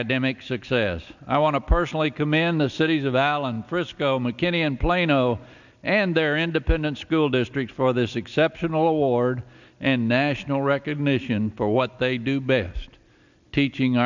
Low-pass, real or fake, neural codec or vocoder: 7.2 kHz; real; none